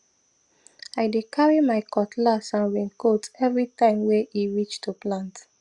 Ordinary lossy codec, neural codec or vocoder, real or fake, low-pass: Opus, 64 kbps; none; real; 10.8 kHz